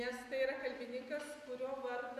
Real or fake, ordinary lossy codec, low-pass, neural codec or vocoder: real; AAC, 96 kbps; 14.4 kHz; none